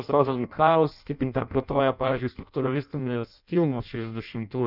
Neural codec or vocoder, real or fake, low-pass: codec, 16 kHz in and 24 kHz out, 0.6 kbps, FireRedTTS-2 codec; fake; 5.4 kHz